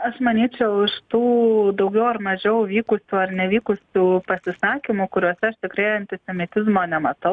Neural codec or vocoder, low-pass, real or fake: none; 9.9 kHz; real